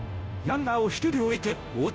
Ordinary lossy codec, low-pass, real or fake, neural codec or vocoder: none; none; fake; codec, 16 kHz, 0.5 kbps, FunCodec, trained on Chinese and English, 25 frames a second